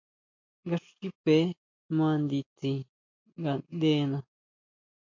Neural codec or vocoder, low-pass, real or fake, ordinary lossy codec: none; 7.2 kHz; real; MP3, 48 kbps